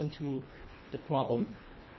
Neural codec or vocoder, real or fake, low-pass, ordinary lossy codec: codec, 24 kHz, 1.5 kbps, HILCodec; fake; 7.2 kHz; MP3, 24 kbps